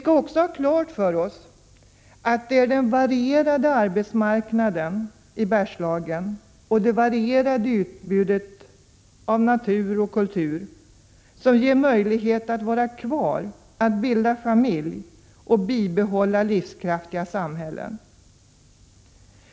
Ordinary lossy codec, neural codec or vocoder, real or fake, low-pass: none; none; real; none